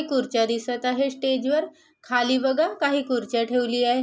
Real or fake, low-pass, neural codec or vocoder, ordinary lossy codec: real; none; none; none